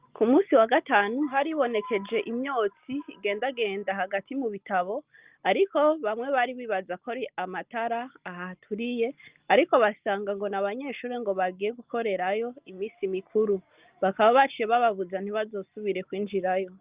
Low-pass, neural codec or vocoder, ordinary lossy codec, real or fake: 3.6 kHz; none; Opus, 24 kbps; real